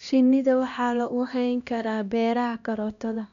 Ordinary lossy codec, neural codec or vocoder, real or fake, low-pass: none; codec, 16 kHz, 1 kbps, X-Codec, HuBERT features, trained on LibriSpeech; fake; 7.2 kHz